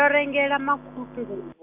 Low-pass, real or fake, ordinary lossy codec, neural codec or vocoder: 3.6 kHz; real; MP3, 32 kbps; none